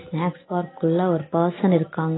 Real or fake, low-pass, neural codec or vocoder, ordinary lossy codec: real; 7.2 kHz; none; AAC, 16 kbps